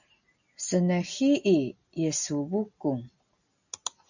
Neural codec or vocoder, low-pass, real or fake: none; 7.2 kHz; real